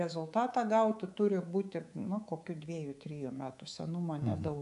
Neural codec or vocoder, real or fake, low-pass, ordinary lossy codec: codec, 24 kHz, 3.1 kbps, DualCodec; fake; 10.8 kHz; AAC, 96 kbps